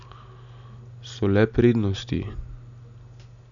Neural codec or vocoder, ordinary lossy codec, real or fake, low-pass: none; none; real; 7.2 kHz